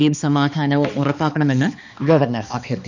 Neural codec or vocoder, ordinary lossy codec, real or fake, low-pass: codec, 16 kHz, 2 kbps, X-Codec, HuBERT features, trained on balanced general audio; none; fake; 7.2 kHz